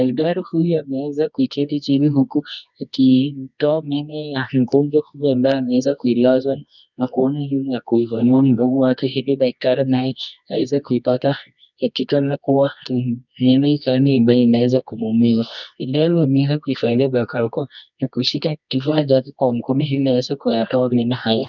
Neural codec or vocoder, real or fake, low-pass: codec, 24 kHz, 0.9 kbps, WavTokenizer, medium music audio release; fake; 7.2 kHz